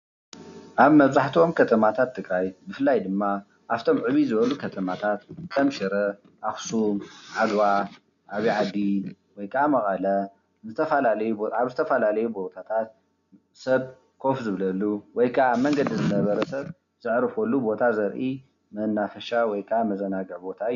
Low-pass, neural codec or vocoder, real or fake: 7.2 kHz; none; real